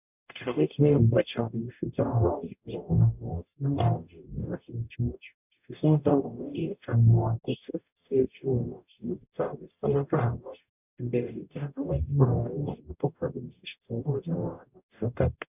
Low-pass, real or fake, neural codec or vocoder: 3.6 kHz; fake; codec, 44.1 kHz, 0.9 kbps, DAC